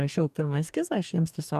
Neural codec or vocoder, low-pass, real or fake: codec, 44.1 kHz, 2.6 kbps, DAC; 14.4 kHz; fake